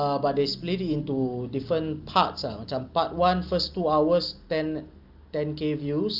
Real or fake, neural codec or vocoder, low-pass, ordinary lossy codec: real; none; 5.4 kHz; Opus, 24 kbps